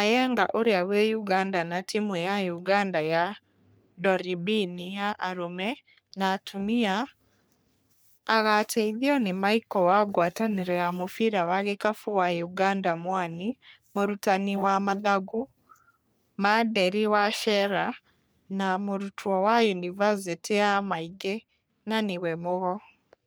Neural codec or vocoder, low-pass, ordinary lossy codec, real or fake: codec, 44.1 kHz, 3.4 kbps, Pupu-Codec; none; none; fake